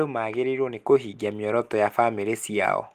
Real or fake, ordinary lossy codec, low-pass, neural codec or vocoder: real; Opus, 24 kbps; 14.4 kHz; none